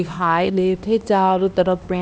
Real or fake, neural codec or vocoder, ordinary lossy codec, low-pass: fake; codec, 16 kHz, 1 kbps, X-Codec, HuBERT features, trained on LibriSpeech; none; none